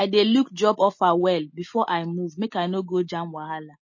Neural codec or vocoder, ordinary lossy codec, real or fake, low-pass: none; MP3, 32 kbps; real; 7.2 kHz